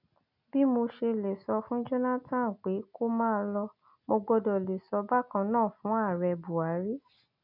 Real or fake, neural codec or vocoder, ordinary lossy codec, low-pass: real; none; none; 5.4 kHz